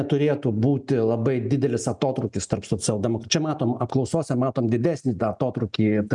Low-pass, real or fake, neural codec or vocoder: 10.8 kHz; real; none